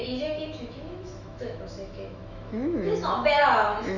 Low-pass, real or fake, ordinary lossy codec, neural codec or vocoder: 7.2 kHz; fake; none; autoencoder, 48 kHz, 128 numbers a frame, DAC-VAE, trained on Japanese speech